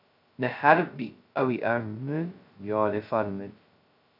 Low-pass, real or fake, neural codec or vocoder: 5.4 kHz; fake; codec, 16 kHz, 0.2 kbps, FocalCodec